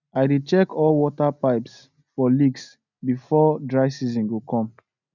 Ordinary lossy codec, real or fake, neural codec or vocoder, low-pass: none; real; none; 7.2 kHz